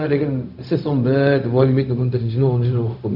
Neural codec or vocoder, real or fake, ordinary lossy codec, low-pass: codec, 16 kHz, 0.4 kbps, LongCat-Audio-Codec; fake; none; 5.4 kHz